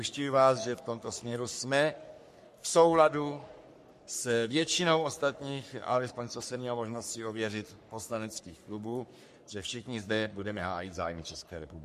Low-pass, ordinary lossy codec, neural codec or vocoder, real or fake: 14.4 kHz; MP3, 64 kbps; codec, 44.1 kHz, 3.4 kbps, Pupu-Codec; fake